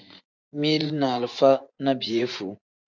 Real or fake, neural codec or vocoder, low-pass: fake; vocoder, 24 kHz, 100 mel bands, Vocos; 7.2 kHz